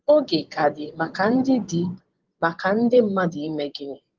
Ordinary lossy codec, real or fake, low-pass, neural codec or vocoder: Opus, 16 kbps; fake; 7.2 kHz; vocoder, 24 kHz, 100 mel bands, Vocos